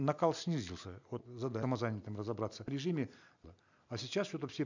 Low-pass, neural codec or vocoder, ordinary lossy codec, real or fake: 7.2 kHz; none; none; real